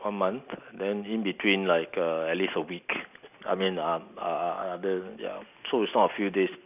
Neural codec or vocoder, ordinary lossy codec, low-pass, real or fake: none; none; 3.6 kHz; real